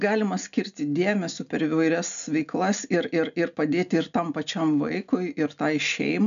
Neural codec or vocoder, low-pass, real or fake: none; 7.2 kHz; real